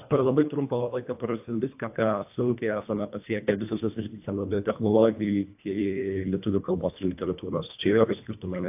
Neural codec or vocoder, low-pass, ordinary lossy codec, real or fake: codec, 24 kHz, 1.5 kbps, HILCodec; 3.6 kHz; AAC, 32 kbps; fake